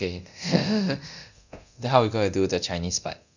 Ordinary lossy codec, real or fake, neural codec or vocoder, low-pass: none; fake; codec, 24 kHz, 0.9 kbps, DualCodec; 7.2 kHz